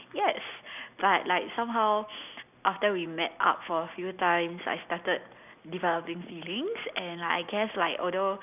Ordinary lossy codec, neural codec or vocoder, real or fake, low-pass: none; none; real; 3.6 kHz